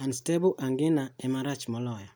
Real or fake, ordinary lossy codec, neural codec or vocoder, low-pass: real; none; none; none